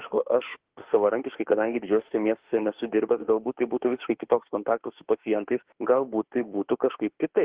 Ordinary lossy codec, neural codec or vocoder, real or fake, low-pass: Opus, 16 kbps; autoencoder, 48 kHz, 32 numbers a frame, DAC-VAE, trained on Japanese speech; fake; 3.6 kHz